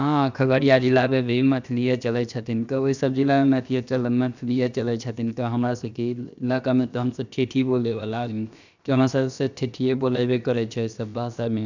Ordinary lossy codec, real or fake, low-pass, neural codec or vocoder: none; fake; 7.2 kHz; codec, 16 kHz, about 1 kbps, DyCAST, with the encoder's durations